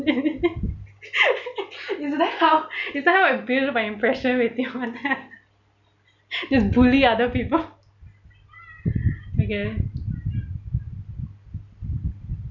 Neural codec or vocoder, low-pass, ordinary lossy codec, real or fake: none; 7.2 kHz; none; real